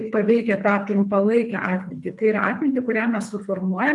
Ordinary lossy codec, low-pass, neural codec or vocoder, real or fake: MP3, 96 kbps; 10.8 kHz; codec, 24 kHz, 3 kbps, HILCodec; fake